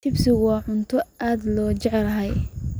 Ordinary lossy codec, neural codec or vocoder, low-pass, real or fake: none; none; none; real